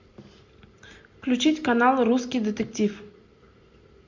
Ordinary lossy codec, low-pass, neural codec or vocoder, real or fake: MP3, 48 kbps; 7.2 kHz; none; real